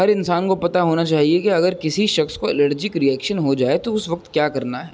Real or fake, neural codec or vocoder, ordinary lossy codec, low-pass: real; none; none; none